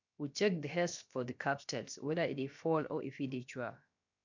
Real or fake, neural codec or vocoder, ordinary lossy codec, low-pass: fake; codec, 16 kHz, about 1 kbps, DyCAST, with the encoder's durations; MP3, 64 kbps; 7.2 kHz